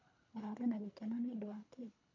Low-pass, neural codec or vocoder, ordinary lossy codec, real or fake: 7.2 kHz; codec, 24 kHz, 3 kbps, HILCodec; none; fake